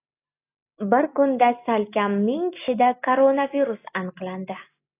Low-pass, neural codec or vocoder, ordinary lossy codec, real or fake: 3.6 kHz; none; AAC, 24 kbps; real